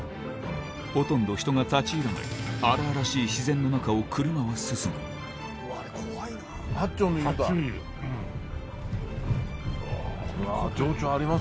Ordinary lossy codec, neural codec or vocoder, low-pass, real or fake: none; none; none; real